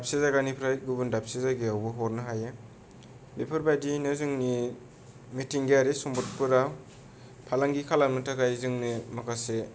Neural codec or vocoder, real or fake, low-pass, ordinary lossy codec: none; real; none; none